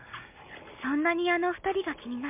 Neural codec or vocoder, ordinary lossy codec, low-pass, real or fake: none; MP3, 32 kbps; 3.6 kHz; real